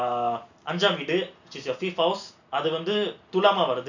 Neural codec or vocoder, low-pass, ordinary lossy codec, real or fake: none; 7.2 kHz; none; real